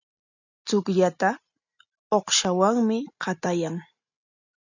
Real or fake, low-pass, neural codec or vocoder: real; 7.2 kHz; none